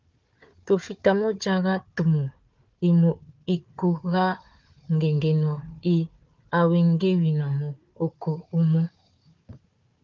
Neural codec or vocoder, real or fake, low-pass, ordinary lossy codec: codec, 16 kHz, 4 kbps, FunCodec, trained on Chinese and English, 50 frames a second; fake; 7.2 kHz; Opus, 32 kbps